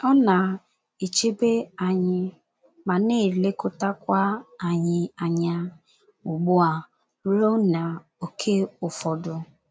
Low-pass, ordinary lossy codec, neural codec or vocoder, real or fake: none; none; none; real